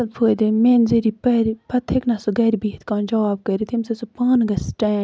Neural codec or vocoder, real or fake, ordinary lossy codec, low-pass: none; real; none; none